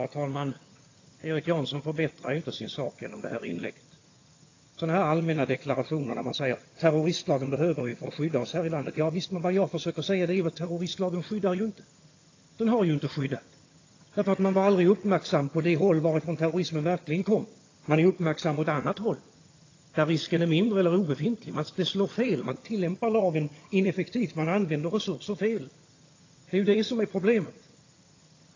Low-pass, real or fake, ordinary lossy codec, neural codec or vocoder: 7.2 kHz; fake; AAC, 32 kbps; vocoder, 22.05 kHz, 80 mel bands, HiFi-GAN